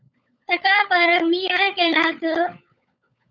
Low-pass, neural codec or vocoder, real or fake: 7.2 kHz; codec, 16 kHz, 16 kbps, FunCodec, trained on LibriTTS, 50 frames a second; fake